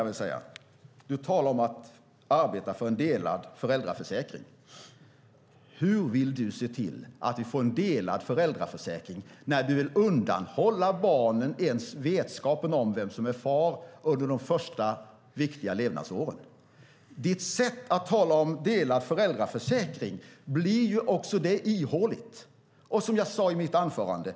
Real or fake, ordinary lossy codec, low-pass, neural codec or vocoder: real; none; none; none